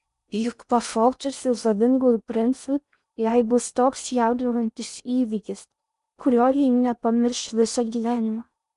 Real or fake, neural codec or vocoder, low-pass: fake; codec, 16 kHz in and 24 kHz out, 0.6 kbps, FocalCodec, streaming, 4096 codes; 10.8 kHz